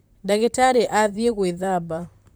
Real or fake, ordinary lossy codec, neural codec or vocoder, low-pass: fake; none; vocoder, 44.1 kHz, 128 mel bands, Pupu-Vocoder; none